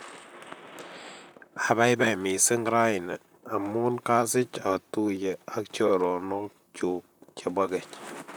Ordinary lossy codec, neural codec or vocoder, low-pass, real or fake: none; vocoder, 44.1 kHz, 128 mel bands, Pupu-Vocoder; none; fake